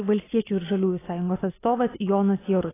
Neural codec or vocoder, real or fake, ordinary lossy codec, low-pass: none; real; AAC, 16 kbps; 3.6 kHz